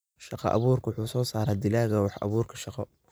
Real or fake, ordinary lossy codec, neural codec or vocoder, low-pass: real; none; none; none